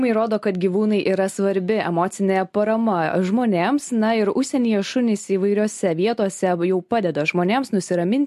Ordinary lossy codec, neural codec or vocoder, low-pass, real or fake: MP3, 64 kbps; none; 14.4 kHz; real